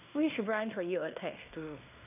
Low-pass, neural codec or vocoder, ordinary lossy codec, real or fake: 3.6 kHz; codec, 16 kHz in and 24 kHz out, 0.9 kbps, LongCat-Audio-Codec, fine tuned four codebook decoder; none; fake